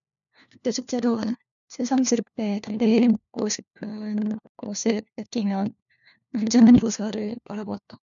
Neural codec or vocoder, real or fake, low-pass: codec, 16 kHz, 1 kbps, FunCodec, trained on LibriTTS, 50 frames a second; fake; 7.2 kHz